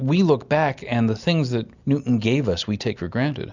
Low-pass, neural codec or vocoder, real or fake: 7.2 kHz; none; real